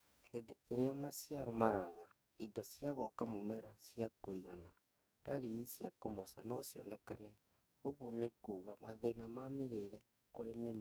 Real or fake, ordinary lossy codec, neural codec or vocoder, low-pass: fake; none; codec, 44.1 kHz, 2.6 kbps, DAC; none